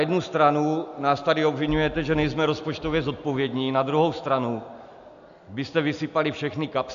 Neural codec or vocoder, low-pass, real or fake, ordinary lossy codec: none; 7.2 kHz; real; AAC, 96 kbps